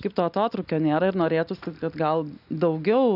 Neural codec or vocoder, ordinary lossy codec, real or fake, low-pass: none; AAC, 48 kbps; real; 5.4 kHz